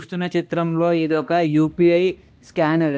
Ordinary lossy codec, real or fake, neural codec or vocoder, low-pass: none; fake; codec, 16 kHz, 1 kbps, X-Codec, HuBERT features, trained on balanced general audio; none